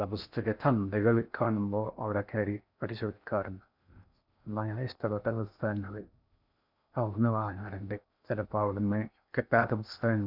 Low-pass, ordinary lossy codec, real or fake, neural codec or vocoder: 5.4 kHz; none; fake; codec, 16 kHz in and 24 kHz out, 0.6 kbps, FocalCodec, streaming, 4096 codes